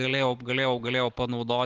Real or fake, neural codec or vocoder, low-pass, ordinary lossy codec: real; none; 7.2 kHz; Opus, 16 kbps